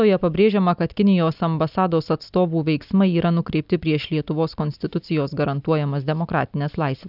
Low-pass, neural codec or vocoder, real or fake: 5.4 kHz; none; real